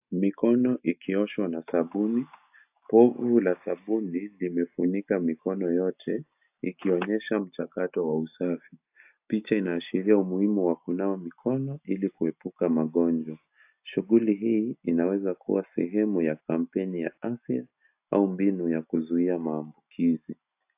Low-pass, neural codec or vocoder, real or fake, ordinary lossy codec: 3.6 kHz; none; real; AAC, 32 kbps